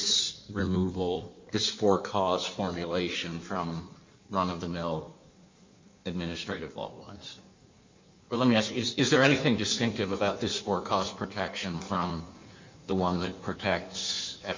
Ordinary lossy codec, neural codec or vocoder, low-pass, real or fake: MP3, 64 kbps; codec, 16 kHz in and 24 kHz out, 1.1 kbps, FireRedTTS-2 codec; 7.2 kHz; fake